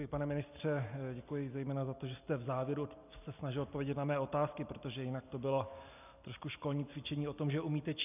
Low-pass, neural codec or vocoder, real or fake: 3.6 kHz; none; real